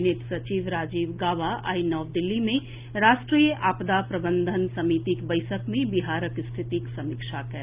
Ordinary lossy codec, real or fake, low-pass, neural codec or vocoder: Opus, 24 kbps; real; 3.6 kHz; none